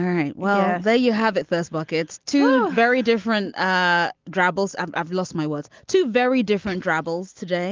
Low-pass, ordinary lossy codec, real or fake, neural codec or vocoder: 7.2 kHz; Opus, 32 kbps; real; none